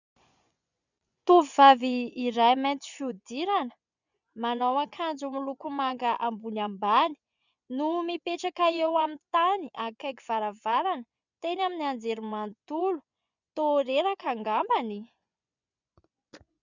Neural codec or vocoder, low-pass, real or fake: vocoder, 24 kHz, 100 mel bands, Vocos; 7.2 kHz; fake